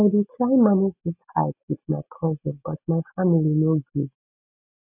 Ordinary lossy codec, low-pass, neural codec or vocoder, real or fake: none; 3.6 kHz; none; real